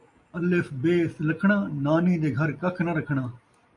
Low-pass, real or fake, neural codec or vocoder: 10.8 kHz; real; none